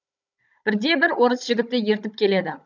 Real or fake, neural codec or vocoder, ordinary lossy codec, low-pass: fake; codec, 16 kHz, 16 kbps, FunCodec, trained on Chinese and English, 50 frames a second; none; 7.2 kHz